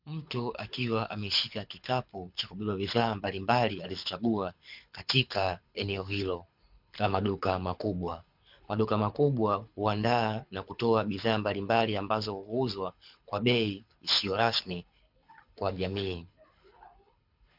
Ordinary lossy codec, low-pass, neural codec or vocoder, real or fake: MP3, 48 kbps; 5.4 kHz; codec, 24 kHz, 6 kbps, HILCodec; fake